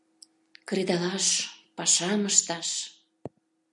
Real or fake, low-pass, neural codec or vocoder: real; 10.8 kHz; none